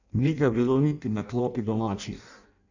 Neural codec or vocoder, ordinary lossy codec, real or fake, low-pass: codec, 16 kHz in and 24 kHz out, 0.6 kbps, FireRedTTS-2 codec; none; fake; 7.2 kHz